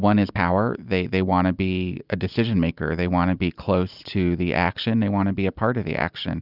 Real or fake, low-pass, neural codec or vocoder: real; 5.4 kHz; none